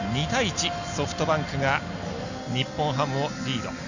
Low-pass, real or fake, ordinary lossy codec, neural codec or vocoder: 7.2 kHz; real; none; none